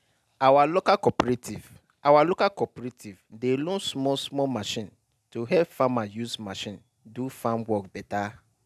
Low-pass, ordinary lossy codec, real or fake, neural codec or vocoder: 14.4 kHz; none; real; none